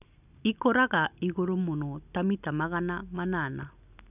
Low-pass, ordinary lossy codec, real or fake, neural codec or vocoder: 3.6 kHz; none; real; none